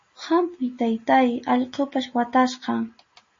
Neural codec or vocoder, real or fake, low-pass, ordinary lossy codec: none; real; 7.2 kHz; MP3, 32 kbps